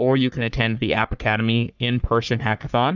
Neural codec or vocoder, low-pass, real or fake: codec, 44.1 kHz, 3.4 kbps, Pupu-Codec; 7.2 kHz; fake